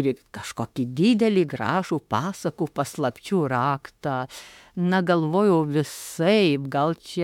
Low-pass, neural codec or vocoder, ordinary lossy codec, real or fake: 19.8 kHz; autoencoder, 48 kHz, 32 numbers a frame, DAC-VAE, trained on Japanese speech; MP3, 96 kbps; fake